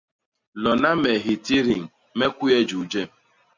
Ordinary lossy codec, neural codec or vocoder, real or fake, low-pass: MP3, 64 kbps; none; real; 7.2 kHz